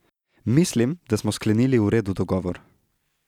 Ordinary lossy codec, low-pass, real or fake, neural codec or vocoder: none; 19.8 kHz; real; none